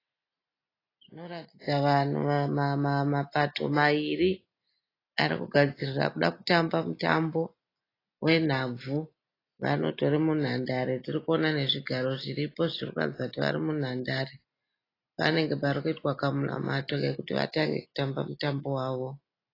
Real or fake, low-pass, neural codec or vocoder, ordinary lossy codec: real; 5.4 kHz; none; AAC, 24 kbps